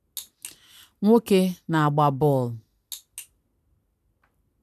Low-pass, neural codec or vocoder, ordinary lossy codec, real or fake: 14.4 kHz; none; none; real